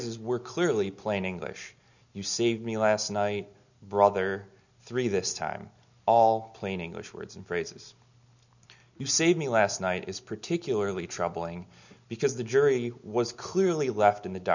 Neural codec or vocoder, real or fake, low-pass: none; real; 7.2 kHz